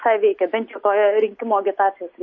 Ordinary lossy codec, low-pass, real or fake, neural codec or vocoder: MP3, 32 kbps; 7.2 kHz; real; none